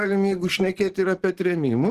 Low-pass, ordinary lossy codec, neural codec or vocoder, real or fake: 14.4 kHz; Opus, 16 kbps; codec, 44.1 kHz, 7.8 kbps, DAC; fake